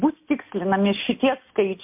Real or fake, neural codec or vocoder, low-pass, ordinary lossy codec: real; none; 3.6 kHz; MP3, 32 kbps